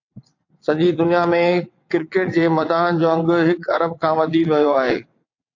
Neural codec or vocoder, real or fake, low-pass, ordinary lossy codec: vocoder, 22.05 kHz, 80 mel bands, WaveNeXt; fake; 7.2 kHz; AAC, 48 kbps